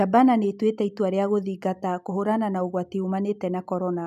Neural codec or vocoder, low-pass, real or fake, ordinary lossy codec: none; 14.4 kHz; real; none